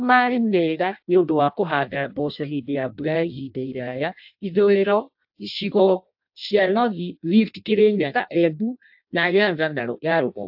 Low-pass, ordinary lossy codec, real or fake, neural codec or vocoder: 5.4 kHz; AAC, 48 kbps; fake; codec, 16 kHz in and 24 kHz out, 0.6 kbps, FireRedTTS-2 codec